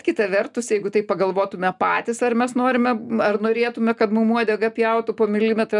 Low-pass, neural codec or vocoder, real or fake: 10.8 kHz; none; real